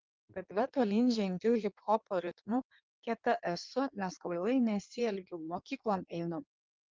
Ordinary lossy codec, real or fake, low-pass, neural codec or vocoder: Opus, 32 kbps; fake; 7.2 kHz; codec, 16 kHz in and 24 kHz out, 1.1 kbps, FireRedTTS-2 codec